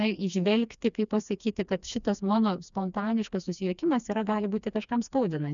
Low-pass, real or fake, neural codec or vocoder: 7.2 kHz; fake; codec, 16 kHz, 2 kbps, FreqCodec, smaller model